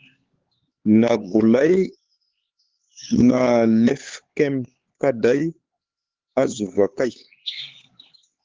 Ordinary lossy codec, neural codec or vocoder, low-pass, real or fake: Opus, 16 kbps; codec, 16 kHz, 4 kbps, X-Codec, HuBERT features, trained on LibriSpeech; 7.2 kHz; fake